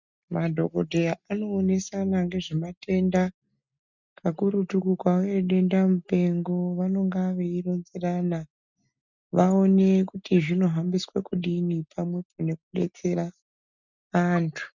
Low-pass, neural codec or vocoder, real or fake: 7.2 kHz; none; real